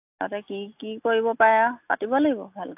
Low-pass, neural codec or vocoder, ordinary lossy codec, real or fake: 3.6 kHz; none; AAC, 32 kbps; real